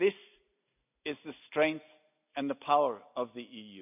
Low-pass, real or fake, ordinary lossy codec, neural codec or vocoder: 3.6 kHz; real; none; none